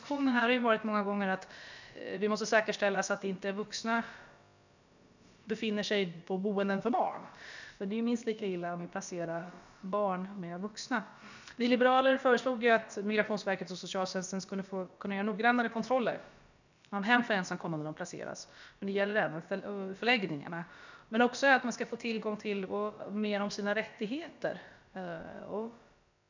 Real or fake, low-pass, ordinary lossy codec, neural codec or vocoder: fake; 7.2 kHz; none; codec, 16 kHz, about 1 kbps, DyCAST, with the encoder's durations